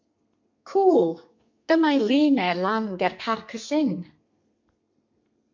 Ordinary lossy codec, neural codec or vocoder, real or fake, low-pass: MP3, 64 kbps; codec, 32 kHz, 1.9 kbps, SNAC; fake; 7.2 kHz